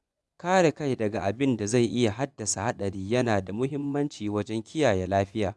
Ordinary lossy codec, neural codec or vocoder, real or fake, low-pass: none; vocoder, 24 kHz, 100 mel bands, Vocos; fake; none